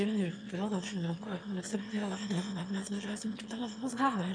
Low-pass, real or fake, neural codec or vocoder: 9.9 kHz; fake; autoencoder, 22.05 kHz, a latent of 192 numbers a frame, VITS, trained on one speaker